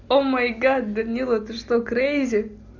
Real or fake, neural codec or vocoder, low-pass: real; none; 7.2 kHz